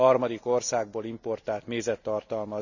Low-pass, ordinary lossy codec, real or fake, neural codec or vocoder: 7.2 kHz; none; real; none